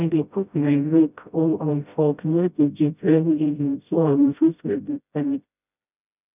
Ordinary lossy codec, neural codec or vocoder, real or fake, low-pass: none; codec, 16 kHz, 0.5 kbps, FreqCodec, smaller model; fake; 3.6 kHz